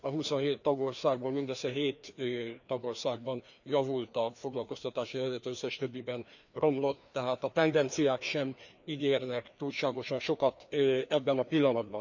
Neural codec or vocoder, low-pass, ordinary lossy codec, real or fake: codec, 16 kHz, 2 kbps, FreqCodec, larger model; 7.2 kHz; none; fake